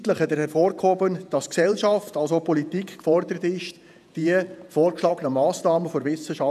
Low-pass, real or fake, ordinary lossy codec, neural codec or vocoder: 14.4 kHz; fake; none; vocoder, 48 kHz, 128 mel bands, Vocos